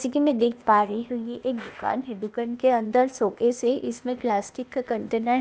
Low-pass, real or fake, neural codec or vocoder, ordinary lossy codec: none; fake; codec, 16 kHz, 0.8 kbps, ZipCodec; none